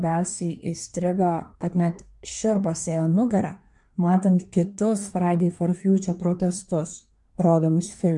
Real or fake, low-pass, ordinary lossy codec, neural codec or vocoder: fake; 10.8 kHz; MP3, 64 kbps; codec, 24 kHz, 1 kbps, SNAC